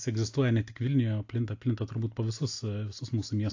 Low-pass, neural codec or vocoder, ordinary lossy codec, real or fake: 7.2 kHz; none; AAC, 48 kbps; real